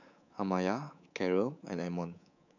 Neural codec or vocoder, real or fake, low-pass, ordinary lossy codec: codec, 24 kHz, 3.1 kbps, DualCodec; fake; 7.2 kHz; none